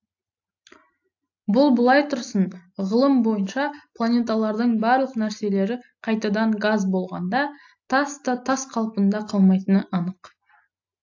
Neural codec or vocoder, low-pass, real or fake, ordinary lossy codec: none; 7.2 kHz; real; AAC, 48 kbps